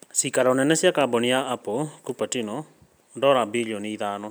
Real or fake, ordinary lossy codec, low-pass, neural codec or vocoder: real; none; none; none